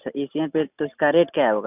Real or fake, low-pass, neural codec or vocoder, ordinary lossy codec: real; 3.6 kHz; none; none